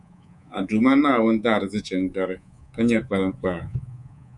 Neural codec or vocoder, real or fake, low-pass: codec, 24 kHz, 3.1 kbps, DualCodec; fake; 10.8 kHz